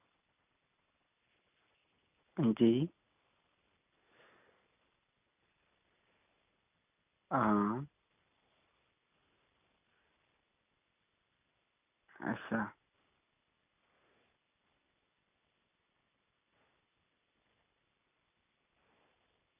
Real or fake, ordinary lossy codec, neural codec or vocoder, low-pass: real; none; none; 3.6 kHz